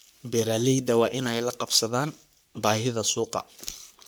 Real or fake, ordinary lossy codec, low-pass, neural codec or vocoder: fake; none; none; codec, 44.1 kHz, 3.4 kbps, Pupu-Codec